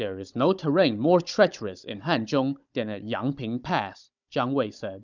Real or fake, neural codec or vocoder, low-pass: fake; codec, 16 kHz, 16 kbps, FunCodec, trained on Chinese and English, 50 frames a second; 7.2 kHz